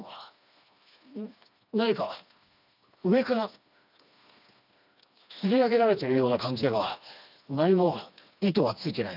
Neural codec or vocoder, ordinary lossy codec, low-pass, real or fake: codec, 16 kHz, 2 kbps, FreqCodec, smaller model; none; 5.4 kHz; fake